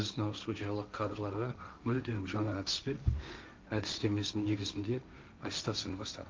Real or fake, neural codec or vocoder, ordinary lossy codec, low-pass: fake; codec, 16 kHz, 1.1 kbps, Voila-Tokenizer; Opus, 32 kbps; 7.2 kHz